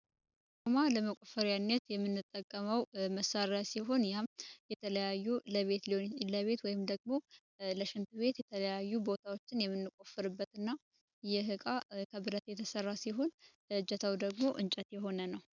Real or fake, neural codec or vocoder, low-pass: real; none; 7.2 kHz